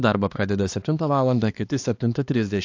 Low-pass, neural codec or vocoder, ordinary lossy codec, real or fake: 7.2 kHz; codec, 16 kHz, 2 kbps, X-Codec, HuBERT features, trained on balanced general audio; AAC, 48 kbps; fake